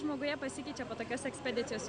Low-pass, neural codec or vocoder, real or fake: 9.9 kHz; none; real